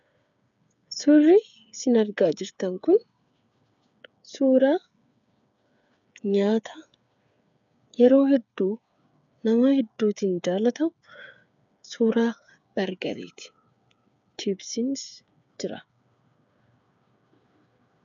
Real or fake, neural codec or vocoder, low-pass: fake; codec, 16 kHz, 8 kbps, FreqCodec, smaller model; 7.2 kHz